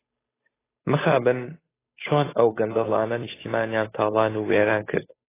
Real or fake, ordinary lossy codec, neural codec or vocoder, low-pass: fake; AAC, 16 kbps; codec, 16 kHz, 8 kbps, FunCodec, trained on Chinese and English, 25 frames a second; 3.6 kHz